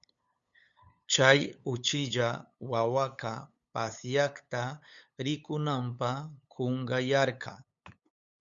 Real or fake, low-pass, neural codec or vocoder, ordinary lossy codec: fake; 7.2 kHz; codec, 16 kHz, 8 kbps, FunCodec, trained on LibriTTS, 25 frames a second; Opus, 64 kbps